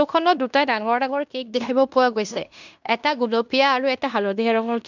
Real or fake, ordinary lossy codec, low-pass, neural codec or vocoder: fake; none; 7.2 kHz; codec, 16 kHz in and 24 kHz out, 0.9 kbps, LongCat-Audio-Codec, fine tuned four codebook decoder